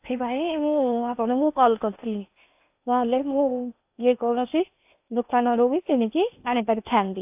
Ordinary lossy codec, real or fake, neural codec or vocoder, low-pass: AAC, 32 kbps; fake; codec, 16 kHz in and 24 kHz out, 0.8 kbps, FocalCodec, streaming, 65536 codes; 3.6 kHz